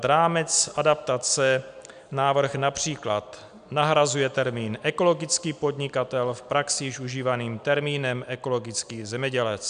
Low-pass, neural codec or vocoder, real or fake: 9.9 kHz; none; real